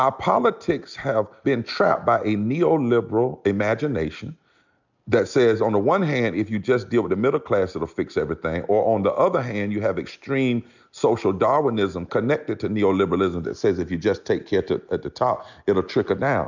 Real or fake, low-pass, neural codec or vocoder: real; 7.2 kHz; none